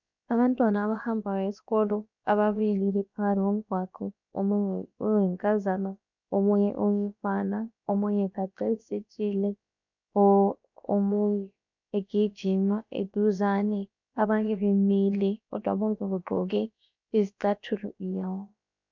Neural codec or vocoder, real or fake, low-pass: codec, 16 kHz, about 1 kbps, DyCAST, with the encoder's durations; fake; 7.2 kHz